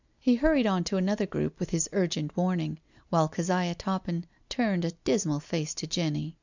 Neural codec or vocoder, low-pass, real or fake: none; 7.2 kHz; real